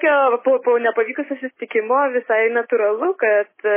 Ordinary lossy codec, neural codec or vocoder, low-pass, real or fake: MP3, 16 kbps; none; 3.6 kHz; real